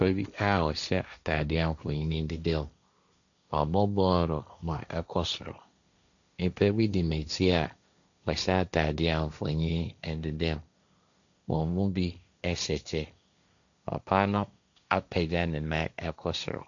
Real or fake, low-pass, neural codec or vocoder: fake; 7.2 kHz; codec, 16 kHz, 1.1 kbps, Voila-Tokenizer